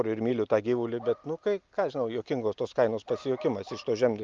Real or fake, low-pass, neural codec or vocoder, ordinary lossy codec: real; 7.2 kHz; none; Opus, 32 kbps